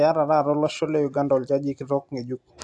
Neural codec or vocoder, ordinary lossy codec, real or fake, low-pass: none; AAC, 64 kbps; real; 10.8 kHz